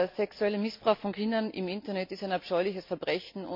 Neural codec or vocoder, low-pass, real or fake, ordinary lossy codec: none; 5.4 kHz; real; MP3, 48 kbps